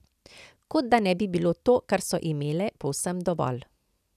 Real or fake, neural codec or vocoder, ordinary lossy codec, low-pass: real; none; none; 14.4 kHz